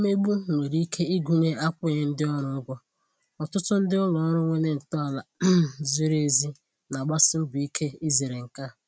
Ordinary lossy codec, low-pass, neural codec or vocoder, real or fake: none; none; none; real